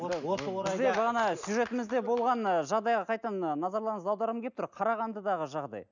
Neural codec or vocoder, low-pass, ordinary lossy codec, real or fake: none; 7.2 kHz; none; real